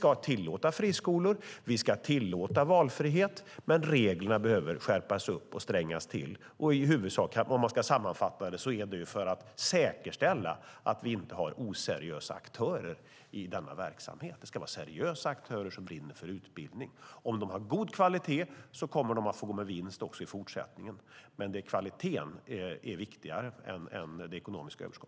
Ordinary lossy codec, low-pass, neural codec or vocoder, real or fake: none; none; none; real